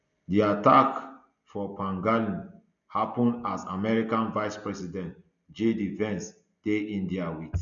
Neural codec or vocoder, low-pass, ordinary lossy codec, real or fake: none; 7.2 kHz; none; real